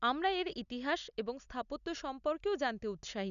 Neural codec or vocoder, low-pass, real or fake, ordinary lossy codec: none; 7.2 kHz; real; none